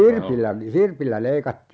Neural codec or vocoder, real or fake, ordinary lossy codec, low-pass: none; real; none; none